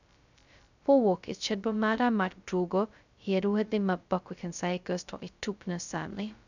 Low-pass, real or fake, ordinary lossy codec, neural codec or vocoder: 7.2 kHz; fake; none; codec, 16 kHz, 0.2 kbps, FocalCodec